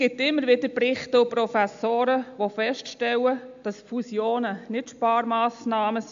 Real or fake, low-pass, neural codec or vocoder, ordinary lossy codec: real; 7.2 kHz; none; AAC, 64 kbps